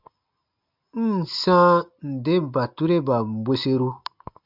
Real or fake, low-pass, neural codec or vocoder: real; 5.4 kHz; none